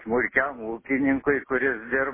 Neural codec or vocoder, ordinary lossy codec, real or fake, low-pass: none; MP3, 16 kbps; real; 3.6 kHz